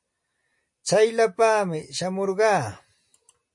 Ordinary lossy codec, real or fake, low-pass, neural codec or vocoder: MP3, 48 kbps; real; 10.8 kHz; none